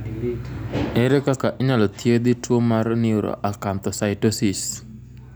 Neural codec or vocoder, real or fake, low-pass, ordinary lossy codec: none; real; none; none